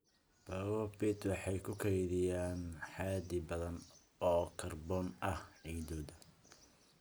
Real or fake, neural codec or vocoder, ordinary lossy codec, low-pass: real; none; none; none